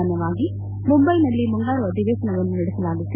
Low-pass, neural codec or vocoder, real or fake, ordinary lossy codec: 3.6 kHz; none; real; none